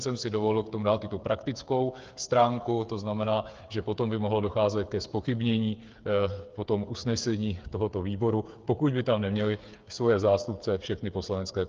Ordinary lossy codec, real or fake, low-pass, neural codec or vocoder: Opus, 32 kbps; fake; 7.2 kHz; codec, 16 kHz, 8 kbps, FreqCodec, smaller model